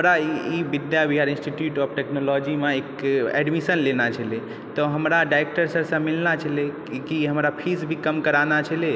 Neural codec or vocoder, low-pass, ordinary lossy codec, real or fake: none; none; none; real